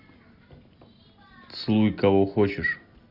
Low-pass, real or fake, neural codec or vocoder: 5.4 kHz; real; none